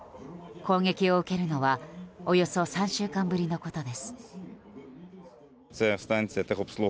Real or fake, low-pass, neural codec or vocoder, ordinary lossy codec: real; none; none; none